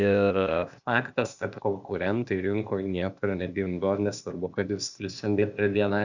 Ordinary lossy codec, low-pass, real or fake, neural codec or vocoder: Opus, 64 kbps; 7.2 kHz; fake; codec, 16 kHz, 0.8 kbps, ZipCodec